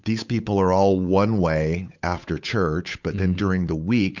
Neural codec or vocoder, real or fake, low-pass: codec, 16 kHz, 8 kbps, FunCodec, trained on Chinese and English, 25 frames a second; fake; 7.2 kHz